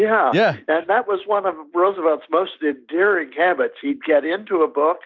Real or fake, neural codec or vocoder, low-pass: real; none; 7.2 kHz